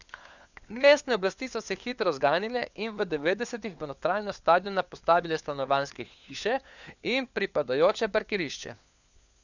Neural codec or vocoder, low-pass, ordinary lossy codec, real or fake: codec, 16 kHz, 4 kbps, FunCodec, trained on LibriTTS, 50 frames a second; 7.2 kHz; none; fake